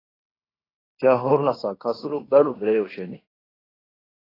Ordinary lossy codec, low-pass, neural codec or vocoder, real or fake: AAC, 24 kbps; 5.4 kHz; codec, 16 kHz in and 24 kHz out, 0.9 kbps, LongCat-Audio-Codec, fine tuned four codebook decoder; fake